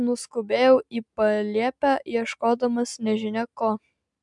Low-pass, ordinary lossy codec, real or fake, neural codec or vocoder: 10.8 kHz; MP3, 96 kbps; real; none